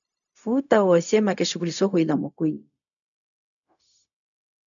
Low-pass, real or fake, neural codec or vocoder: 7.2 kHz; fake; codec, 16 kHz, 0.4 kbps, LongCat-Audio-Codec